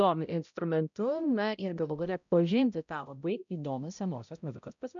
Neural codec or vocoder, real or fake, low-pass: codec, 16 kHz, 0.5 kbps, X-Codec, HuBERT features, trained on balanced general audio; fake; 7.2 kHz